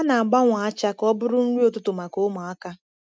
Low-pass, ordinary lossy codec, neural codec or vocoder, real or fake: none; none; none; real